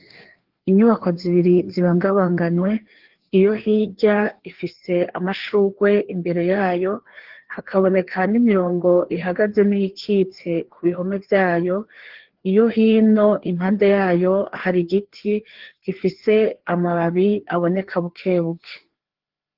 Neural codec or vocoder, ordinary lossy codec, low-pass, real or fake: codec, 16 kHz, 2 kbps, FreqCodec, larger model; Opus, 16 kbps; 5.4 kHz; fake